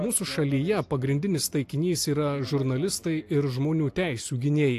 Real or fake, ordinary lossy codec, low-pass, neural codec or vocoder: real; AAC, 64 kbps; 14.4 kHz; none